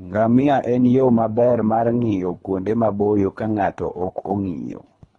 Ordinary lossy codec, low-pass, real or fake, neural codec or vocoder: AAC, 32 kbps; 10.8 kHz; fake; codec, 24 kHz, 3 kbps, HILCodec